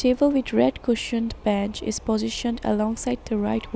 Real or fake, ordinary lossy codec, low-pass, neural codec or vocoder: real; none; none; none